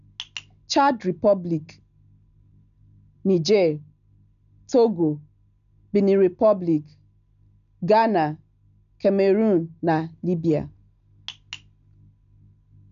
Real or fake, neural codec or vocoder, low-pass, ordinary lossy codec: real; none; 7.2 kHz; none